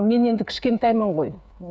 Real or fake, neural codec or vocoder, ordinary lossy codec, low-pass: fake; codec, 16 kHz, 8 kbps, FreqCodec, smaller model; none; none